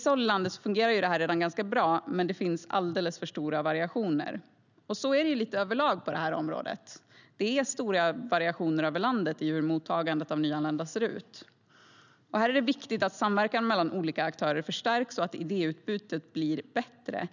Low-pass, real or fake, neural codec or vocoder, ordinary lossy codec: 7.2 kHz; real; none; none